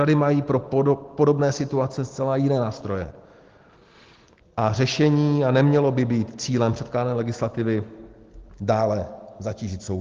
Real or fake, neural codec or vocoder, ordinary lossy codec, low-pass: real; none; Opus, 16 kbps; 7.2 kHz